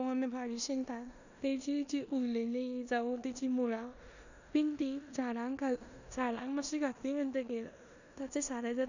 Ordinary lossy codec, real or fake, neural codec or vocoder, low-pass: none; fake; codec, 16 kHz in and 24 kHz out, 0.9 kbps, LongCat-Audio-Codec, four codebook decoder; 7.2 kHz